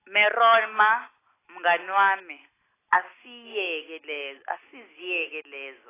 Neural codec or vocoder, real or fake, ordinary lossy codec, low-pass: none; real; AAC, 16 kbps; 3.6 kHz